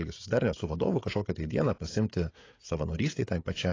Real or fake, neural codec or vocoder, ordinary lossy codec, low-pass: fake; codec, 16 kHz, 16 kbps, FreqCodec, smaller model; AAC, 32 kbps; 7.2 kHz